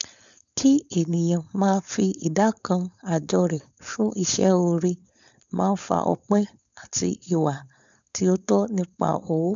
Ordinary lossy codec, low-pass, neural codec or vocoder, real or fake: none; 7.2 kHz; codec, 16 kHz, 4.8 kbps, FACodec; fake